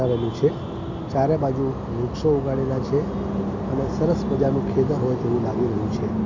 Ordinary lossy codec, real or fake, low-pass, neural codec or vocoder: MP3, 64 kbps; real; 7.2 kHz; none